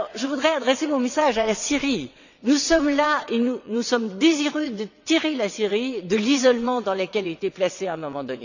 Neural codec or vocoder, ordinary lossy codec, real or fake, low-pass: vocoder, 22.05 kHz, 80 mel bands, WaveNeXt; none; fake; 7.2 kHz